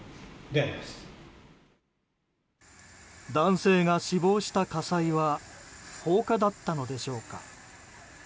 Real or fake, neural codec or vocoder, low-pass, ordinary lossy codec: real; none; none; none